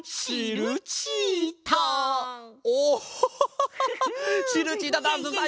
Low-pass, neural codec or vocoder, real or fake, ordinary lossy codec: none; none; real; none